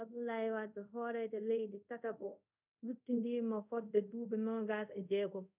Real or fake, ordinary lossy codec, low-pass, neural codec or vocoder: fake; none; 3.6 kHz; codec, 24 kHz, 0.5 kbps, DualCodec